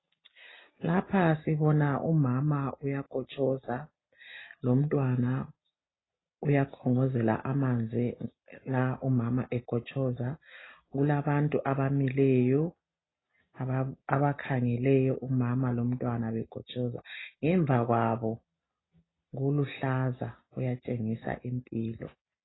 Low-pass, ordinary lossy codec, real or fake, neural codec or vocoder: 7.2 kHz; AAC, 16 kbps; real; none